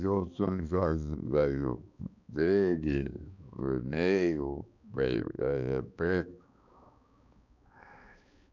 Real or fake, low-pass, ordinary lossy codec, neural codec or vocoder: fake; 7.2 kHz; none; codec, 16 kHz, 2 kbps, X-Codec, HuBERT features, trained on balanced general audio